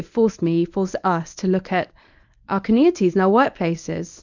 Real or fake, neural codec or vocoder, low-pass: fake; codec, 24 kHz, 0.9 kbps, WavTokenizer, medium speech release version 1; 7.2 kHz